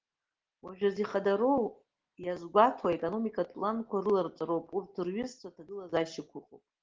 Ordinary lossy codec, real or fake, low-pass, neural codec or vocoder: Opus, 16 kbps; real; 7.2 kHz; none